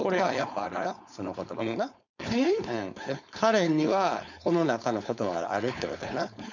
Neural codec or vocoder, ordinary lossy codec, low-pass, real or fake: codec, 16 kHz, 4.8 kbps, FACodec; none; 7.2 kHz; fake